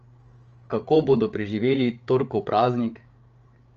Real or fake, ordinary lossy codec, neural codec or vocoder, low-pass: fake; Opus, 24 kbps; codec, 16 kHz, 8 kbps, FreqCodec, larger model; 7.2 kHz